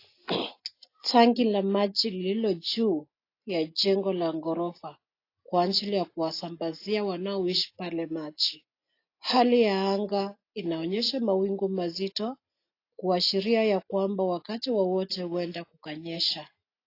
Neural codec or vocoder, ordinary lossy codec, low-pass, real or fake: none; AAC, 32 kbps; 5.4 kHz; real